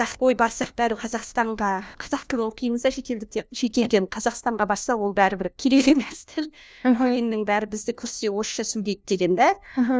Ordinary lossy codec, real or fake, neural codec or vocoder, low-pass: none; fake; codec, 16 kHz, 1 kbps, FunCodec, trained on LibriTTS, 50 frames a second; none